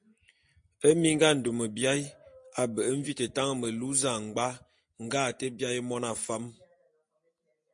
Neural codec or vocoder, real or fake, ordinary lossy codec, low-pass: none; real; MP3, 48 kbps; 9.9 kHz